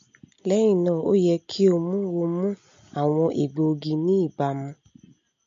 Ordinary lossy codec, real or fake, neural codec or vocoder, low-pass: MP3, 48 kbps; real; none; 7.2 kHz